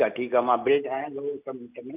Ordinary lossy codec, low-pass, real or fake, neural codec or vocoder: none; 3.6 kHz; real; none